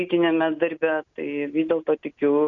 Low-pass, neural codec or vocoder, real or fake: 7.2 kHz; none; real